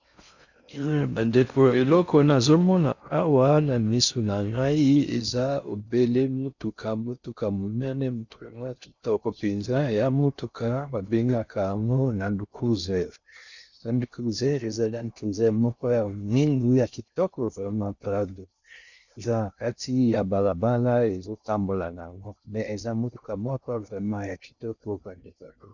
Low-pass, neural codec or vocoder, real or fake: 7.2 kHz; codec, 16 kHz in and 24 kHz out, 0.6 kbps, FocalCodec, streaming, 4096 codes; fake